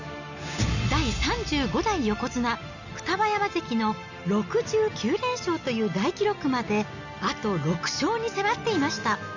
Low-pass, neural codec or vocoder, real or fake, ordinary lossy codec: 7.2 kHz; none; real; none